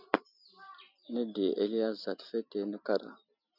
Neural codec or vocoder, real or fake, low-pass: none; real; 5.4 kHz